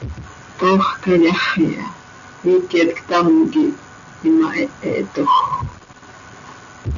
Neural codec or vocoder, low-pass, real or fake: none; 7.2 kHz; real